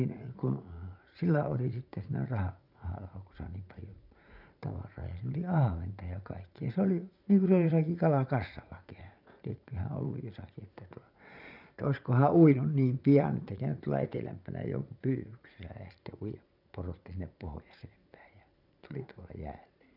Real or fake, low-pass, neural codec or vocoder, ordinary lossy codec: fake; 5.4 kHz; vocoder, 44.1 kHz, 80 mel bands, Vocos; none